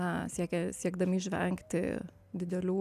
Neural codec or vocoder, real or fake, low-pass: none; real; 14.4 kHz